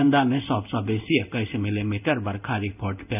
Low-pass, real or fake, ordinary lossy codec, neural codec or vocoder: 3.6 kHz; fake; none; codec, 16 kHz in and 24 kHz out, 1 kbps, XY-Tokenizer